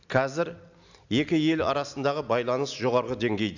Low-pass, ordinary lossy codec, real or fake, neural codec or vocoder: 7.2 kHz; none; real; none